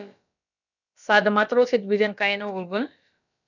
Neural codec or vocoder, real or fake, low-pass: codec, 16 kHz, about 1 kbps, DyCAST, with the encoder's durations; fake; 7.2 kHz